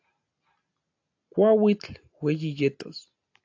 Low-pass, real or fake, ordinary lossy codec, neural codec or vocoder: 7.2 kHz; real; AAC, 48 kbps; none